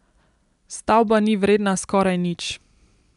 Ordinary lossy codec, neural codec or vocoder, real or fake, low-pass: none; none; real; 10.8 kHz